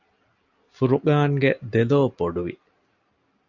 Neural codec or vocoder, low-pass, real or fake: none; 7.2 kHz; real